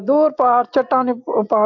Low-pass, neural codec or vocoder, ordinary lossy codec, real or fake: 7.2 kHz; none; AAC, 48 kbps; real